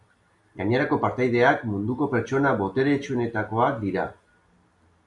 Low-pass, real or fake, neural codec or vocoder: 10.8 kHz; real; none